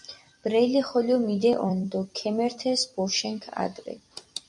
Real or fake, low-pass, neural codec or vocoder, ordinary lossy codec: fake; 10.8 kHz; vocoder, 44.1 kHz, 128 mel bands every 256 samples, BigVGAN v2; MP3, 96 kbps